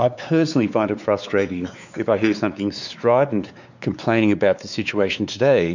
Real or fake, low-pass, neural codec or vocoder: fake; 7.2 kHz; codec, 16 kHz, 4 kbps, X-Codec, WavLM features, trained on Multilingual LibriSpeech